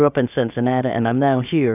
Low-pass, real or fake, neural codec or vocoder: 3.6 kHz; fake; codec, 16 kHz, about 1 kbps, DyCAST, with the encoder's durations